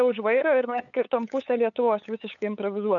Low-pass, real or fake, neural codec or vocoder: 7.2 kHz; fake; codec, 16 kHz, 8 kbps, FunCodec, trained on LibriTTS, 25 frames a second